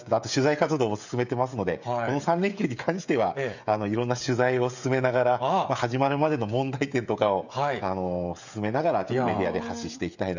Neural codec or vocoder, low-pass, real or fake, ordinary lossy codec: codec, 16 kHz, 16 kbps, FreqCodec, smaller model; 7.2 kHz; fake; none